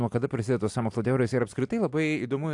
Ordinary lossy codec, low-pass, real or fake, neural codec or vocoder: AAC, 64 kbps; 10.8 kHz; real; none